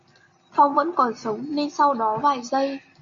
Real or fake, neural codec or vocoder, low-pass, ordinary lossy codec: real; none; 7.2 kHz; MP3, 48 kbps